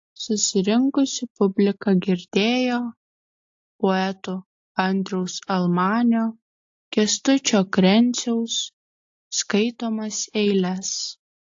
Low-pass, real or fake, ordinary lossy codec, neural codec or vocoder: 7.2 kHz; real; AAC, 48 kbps; none